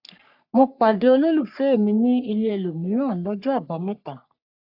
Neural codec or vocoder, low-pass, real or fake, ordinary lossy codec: codec, 44.1 kHz, 3.4 kbps, Pupu-Codec; 5.4 kHz; fake; AAC, 48 kbps